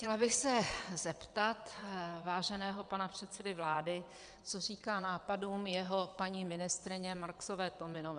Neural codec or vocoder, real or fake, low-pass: vocoder, 22.05 kHz, 80 mel bands, WaveNeXt; fake; 9.9 kHz